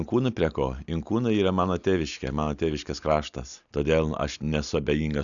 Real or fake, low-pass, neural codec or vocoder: real; 7.2 kHz; none